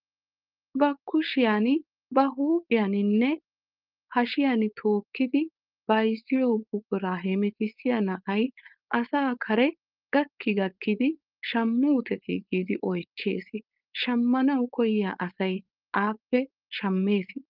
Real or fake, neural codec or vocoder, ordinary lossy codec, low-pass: fake; codec, 16 kHz, 4.8 kbps, FACodec; Opus, 24 kbps; 5.4 kHz